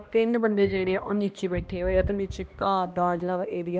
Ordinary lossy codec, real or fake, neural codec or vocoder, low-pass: none; fake; codec, 16 kHz, 1 kbps, X-Codec, HuBERT features, trained on balanced general audio; none